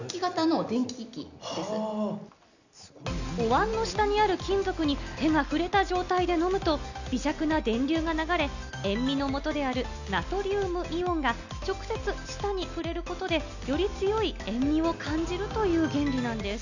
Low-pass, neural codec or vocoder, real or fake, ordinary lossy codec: 7.2 kHz; none; real; none